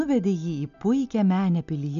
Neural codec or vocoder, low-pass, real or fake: none; 7.2 kHz; real